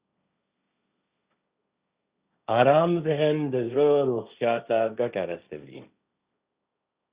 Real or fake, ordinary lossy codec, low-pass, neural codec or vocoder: fake; Opus, 64 kbps; 3.6 kHz; codec, 16 kHz, 1.1 kbps, Voila-Tokenizer